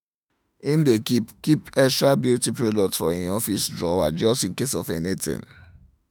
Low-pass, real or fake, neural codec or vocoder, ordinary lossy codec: none; fake; autoencoder, 48 kHz, 32 numbers a frame, DAC-VAE, trained on Japanese speech; none